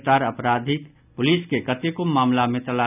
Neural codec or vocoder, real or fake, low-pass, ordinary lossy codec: none; real; 3.6 kHz; none